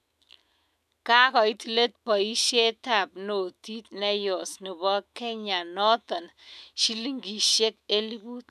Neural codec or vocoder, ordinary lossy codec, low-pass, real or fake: autoencoder, 48 kHz, 128 numbers a frame, DAC-VAE, trained on Japanese speech; none; 14.4 kHz; fake